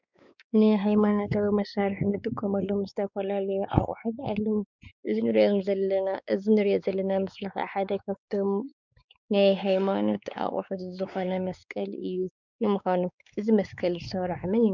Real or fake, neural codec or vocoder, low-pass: fake; codec, 16 kHz, 4 kbps, X-Codec, WavLM features, trained on Multilingual LibriSpeech; 7.2 kHz